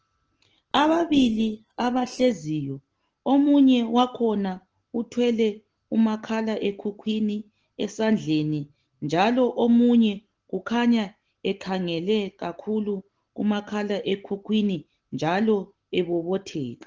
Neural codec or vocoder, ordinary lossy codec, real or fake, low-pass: none; Opus, 16 kbps; real; 7.2 kHz